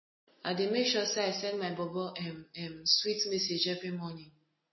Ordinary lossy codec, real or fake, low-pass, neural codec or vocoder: MP3, 24 kbps; real; 7.2 kHz; none